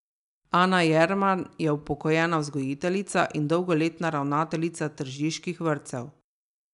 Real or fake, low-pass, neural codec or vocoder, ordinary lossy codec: real; 10.8 kHz; none; none